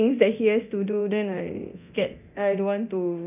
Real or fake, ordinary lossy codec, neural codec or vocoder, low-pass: fake; none; codec, 24 kHz, 0.9 kbps, DualCodec; 3.6 kHz